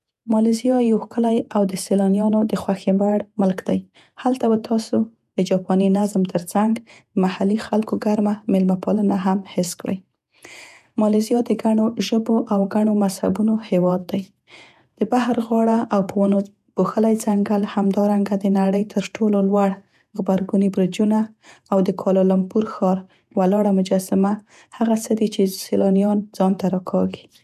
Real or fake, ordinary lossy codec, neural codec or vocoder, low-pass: fake; none; vocoder, 48 kHz, 128 mel bands, Vocos; 14.4 kHz